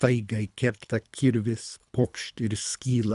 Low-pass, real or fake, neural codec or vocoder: 10.8 kHz; fake; codec, 24 kHz, 3 kbps, HILCodec